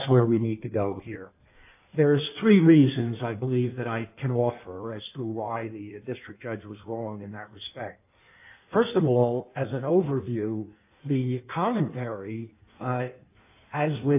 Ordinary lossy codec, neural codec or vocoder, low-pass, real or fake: AAC, 32 kbps; codec, 16 kHz in and 24 kHz out, 1.1 kbps, FireRedTTS-2 codec; 3.6 kHz; fake